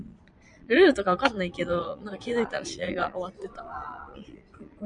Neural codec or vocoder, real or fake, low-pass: vocoder, 22.05 kHz, 80 mel bands, Vocos; fake; 9.9 kHz